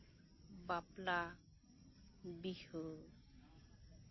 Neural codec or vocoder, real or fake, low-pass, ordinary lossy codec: none; real; 7.2 kHz; MP3, 24 kbps